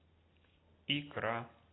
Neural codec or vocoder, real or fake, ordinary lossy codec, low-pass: none; real; AAC, 16 kbps; 7.2 kHz